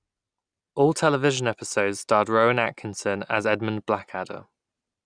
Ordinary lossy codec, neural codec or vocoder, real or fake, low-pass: none; none; real; 9.9 kHz